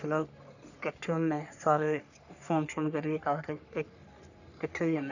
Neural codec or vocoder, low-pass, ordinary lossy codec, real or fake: codec, 44.1 kHz, 3.4 kbps, Pupu-Codec; 7.2 kHz; none; fake